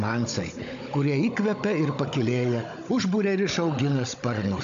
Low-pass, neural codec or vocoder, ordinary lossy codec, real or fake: 7.2 kHz; codec, 16 kHz, 16 kbps, FunCodec, trained on Chinese and English, 50 frames a second; AAC, 64 kbps; fake